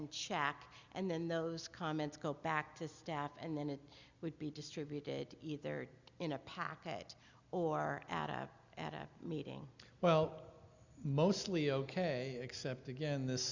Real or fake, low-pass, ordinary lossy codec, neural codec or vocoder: real; 7.2 kHz; Opus, 64 kbps; none